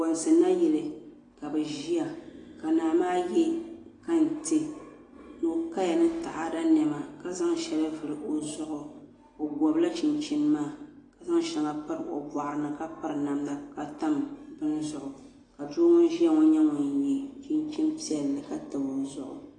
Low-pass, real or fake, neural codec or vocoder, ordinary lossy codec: 10.8 kHz; real; none; AAC, 32 kbps